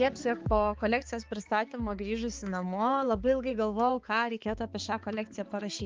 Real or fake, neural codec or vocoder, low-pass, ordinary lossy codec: fake; codec, 16 kHz, 4 kbps, X-Codec, HuBERT features, trained on balanced general audio; 7.2 kHz; Opus, 32 kbps